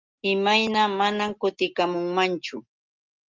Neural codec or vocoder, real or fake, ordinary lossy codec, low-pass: none; real; Opus, 32 kbps; 7.2 kHz